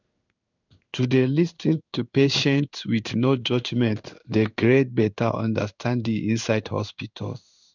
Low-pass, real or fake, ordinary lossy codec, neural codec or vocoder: 7.2 kHz; fake; none; codec, 16 kHz in and 24 kHz out, 1 kbps, XY-Tokenizer